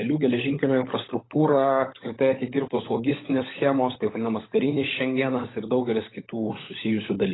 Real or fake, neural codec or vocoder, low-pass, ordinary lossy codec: fake; codec, 16 kHz, 16 kbps, FunCodec, trained on LibriTTS, 50 frames a second; 7.2 kHz; AAC, 16 kbps